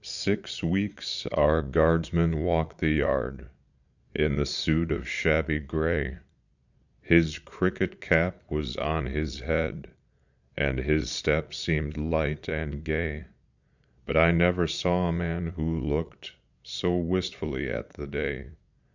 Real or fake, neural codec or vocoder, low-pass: fake; vocoder, 22.05 kHz, 80 mel bands, Vocos; 7.2 kHz